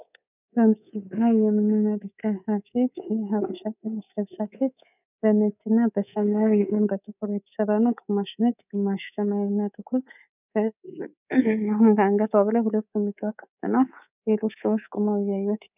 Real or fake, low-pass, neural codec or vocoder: fake; 3.6 kHz; codec, 24 kHz, 3.1 kbps, DualCodec